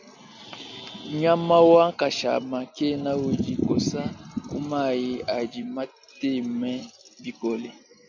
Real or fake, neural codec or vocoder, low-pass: real; none; 7.2 kHz